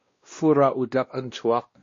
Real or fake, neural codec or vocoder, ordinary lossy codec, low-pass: fake; codec, 16 kHz, 1 kbps, X-Codec, WavLM features, trained on Multilingual LibriSpeech; MP3, 32 kbps; 7.2 kHz